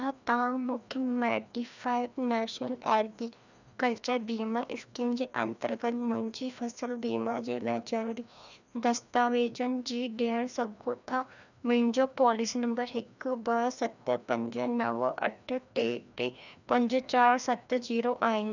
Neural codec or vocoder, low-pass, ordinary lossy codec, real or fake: codec, 16 kHz, 1 kbps, FreqCodec, larger model; 7.2 kHz; none; fake